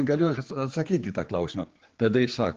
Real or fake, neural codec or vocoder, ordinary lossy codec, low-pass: fake; codec, 16 kHz, 4 kbps, X-Codec, HuBERT features, trained on general audio; Opus, 16 kbps; 7.2 kHz